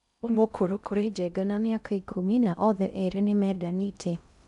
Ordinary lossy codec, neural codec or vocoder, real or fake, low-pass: none; codec, 16 kHz in and 24 kHz out, 0.6 kbps, FocalCodec, streaming, 4096 codes; fake; 10.8 kHz